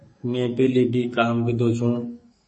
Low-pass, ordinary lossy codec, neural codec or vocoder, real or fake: 10.8 kHz; MP3, 32 kbps; codec, 44.1 kHz, 2.6 kbps, SNAC; fake